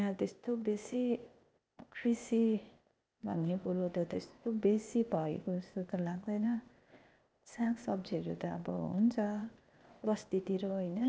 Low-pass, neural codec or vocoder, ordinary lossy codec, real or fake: none; codec, 16 kHz, 0.8 kbps, ZipCodec; none; fake